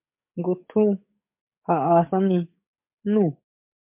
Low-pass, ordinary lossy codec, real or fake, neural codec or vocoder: 3.6 kHz; MP3, 32 kbps; fake; codec, 44.1 kHz, 7.8 kbps, DAC